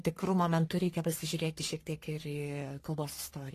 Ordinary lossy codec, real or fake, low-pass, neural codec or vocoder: AAC, 48 kbps; fake; 14.4 kHz; codec, 44.1 kHz, 3.4 kbps, Pupu-Codec